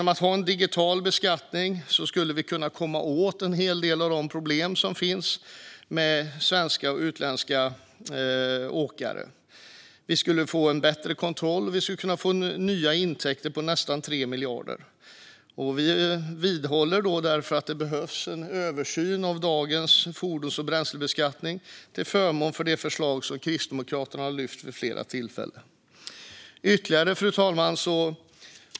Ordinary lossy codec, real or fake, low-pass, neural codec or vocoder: none; real; none; none